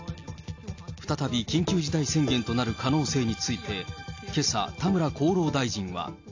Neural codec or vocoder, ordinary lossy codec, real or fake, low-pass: none; AAC, 48 kbps; real; 7.2 kHz